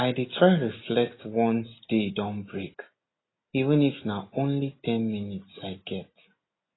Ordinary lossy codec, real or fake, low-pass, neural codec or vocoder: AAC, 16 kbps; real; 7.2 kHz; none